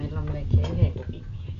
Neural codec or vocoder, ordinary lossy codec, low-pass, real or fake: none; none; 7.2 kHz; real